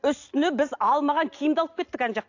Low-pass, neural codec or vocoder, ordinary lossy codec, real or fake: 7.2 kHz; none; AAC, 48 kbps; real